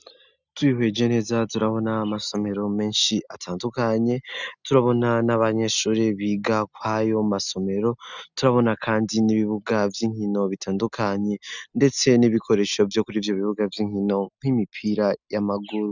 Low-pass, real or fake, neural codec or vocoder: 7.2 kHz; real; none